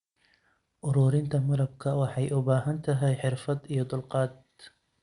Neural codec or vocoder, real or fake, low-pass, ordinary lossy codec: none; real; 10.8 kHz; Opus, 64 kbps